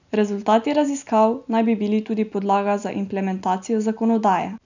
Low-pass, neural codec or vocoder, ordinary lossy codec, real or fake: 7.2 kHz; none; none; real